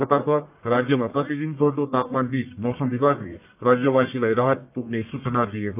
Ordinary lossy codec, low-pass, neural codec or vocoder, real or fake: AAC, 32 kbps; 3.6 kHz; codec, 44.1 kHz, 1.7 kbps, Pupu-Codec; fake